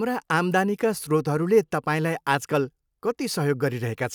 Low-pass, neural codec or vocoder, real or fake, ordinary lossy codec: none; none; real; none